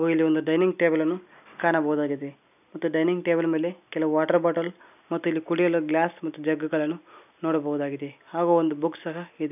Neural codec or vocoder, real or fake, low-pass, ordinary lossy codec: none; real; 3.6 kHz; none